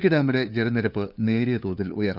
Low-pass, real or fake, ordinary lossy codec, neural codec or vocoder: 5.4 kHz; fake; none; codec, 16 kHz, 2 kbps, FunCodec, trained on LibriTTS, 25 frames a second